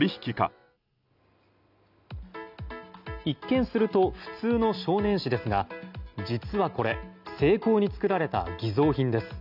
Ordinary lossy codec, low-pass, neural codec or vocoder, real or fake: none; 5.4 kHz; none; real